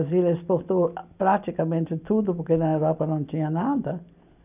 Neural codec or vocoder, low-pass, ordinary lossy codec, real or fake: none; 3.6 kHz; none; real